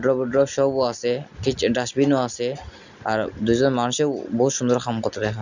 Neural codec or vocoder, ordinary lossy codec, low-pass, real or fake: none; none; 7.2 kHz; real